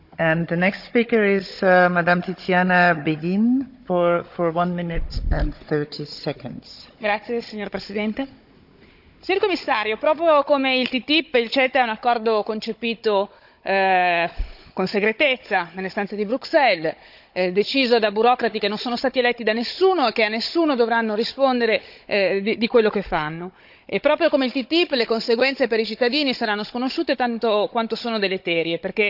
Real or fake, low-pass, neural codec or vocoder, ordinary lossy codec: fake; 5.4 kHz; codec, 16 kHz, 16 kbps, FunCodec, trained on Chinese and English, 50 frames a second; none